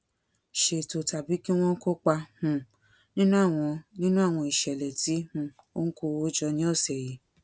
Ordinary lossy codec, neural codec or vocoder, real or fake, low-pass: none; none; real; none